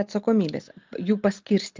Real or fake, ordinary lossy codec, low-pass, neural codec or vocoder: real; Opus, 32 kbps; 7.2 kHz; none